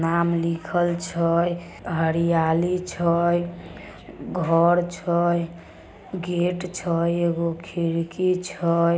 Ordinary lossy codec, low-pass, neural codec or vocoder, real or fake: none; none; none; real